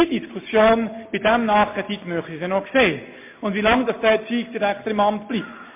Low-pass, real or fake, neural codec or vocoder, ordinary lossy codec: 3.6 kHz; real; none; MP3, 24 kbps